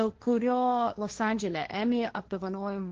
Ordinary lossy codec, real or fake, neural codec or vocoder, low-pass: Opus, 16 kbps; fake; codec, 16 kHz, 1.1 kbps, Voila-Tokenizer; 7.2 kHz